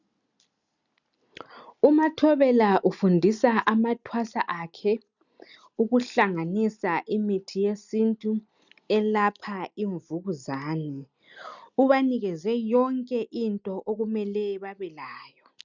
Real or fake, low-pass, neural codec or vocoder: real; 7.2 kHz; none